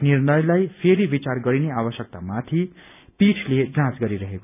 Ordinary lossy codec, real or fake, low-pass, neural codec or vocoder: none; real; 3.6 kHz; none